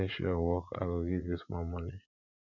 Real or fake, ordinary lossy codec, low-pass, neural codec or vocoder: fake; none; 7.2 kHz; vocoder, 44.1 kHz, 80 mel bands, Vocos